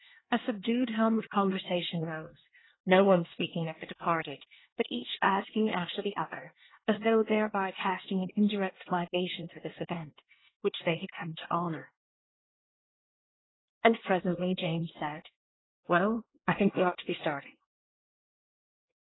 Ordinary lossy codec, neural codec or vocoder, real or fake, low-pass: AAC, 16 kbps; codec, 24 kHz, 1 kbps, SNAC; fake; 7.2 kHz